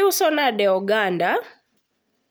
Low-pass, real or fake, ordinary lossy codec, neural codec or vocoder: none; real; none; none